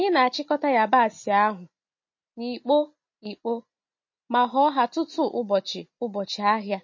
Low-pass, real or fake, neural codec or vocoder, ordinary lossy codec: 7.2 kHz; real; none; MP3, 32 kbps